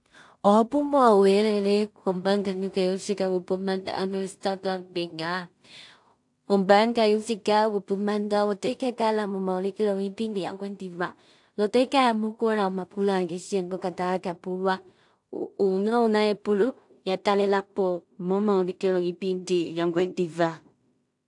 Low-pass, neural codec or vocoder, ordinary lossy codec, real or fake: 10.8 kHz; codec, 16 kHz in and 24 kHz out, 0.4 kbps, LongCat-Audio-Codec, two codebook decoder; AAC, 64 kbps; fake